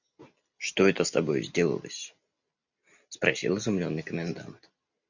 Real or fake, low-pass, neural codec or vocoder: real; 7.2 kHz; none